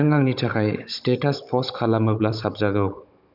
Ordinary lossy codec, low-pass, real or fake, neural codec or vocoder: none; 5.4 kHz; fake; codec, 16 kHz, 16 kbps, FunCodec, trained on Chinese and English, 50 frames a second